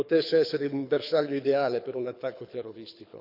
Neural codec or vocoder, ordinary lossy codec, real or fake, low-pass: codec, 24 kHz, 6 kbps, HILCodec; none; fake; 5.4 kHz